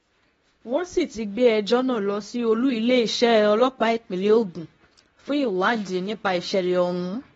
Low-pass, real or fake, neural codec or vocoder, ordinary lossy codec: 10.8 kHz; fake; codec, 24 kHz, 0.9 kbps, WavTokenizer, medium speech release version 2; AAC, 24 kbps